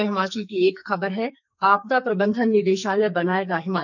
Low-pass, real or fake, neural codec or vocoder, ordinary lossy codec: 7.2 kHz; fake; codec, 44.1 kHz, 2.6 kbps, SNAC; none